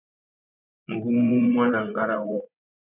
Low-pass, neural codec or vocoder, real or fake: 3.6 kHz; vocoder, 24 kHz, 100 mel bands, Vocos; fake